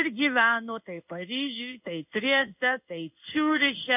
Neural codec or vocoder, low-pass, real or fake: codec, 16 kHz in and 24 kHz out, 1 kbps, XY-Tokenizer; 3.6 kHz; fake